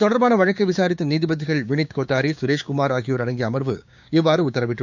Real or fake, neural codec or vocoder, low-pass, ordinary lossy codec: fake; codec, 16 kHz, 6 kbps, DAC; 7.2 kHz; none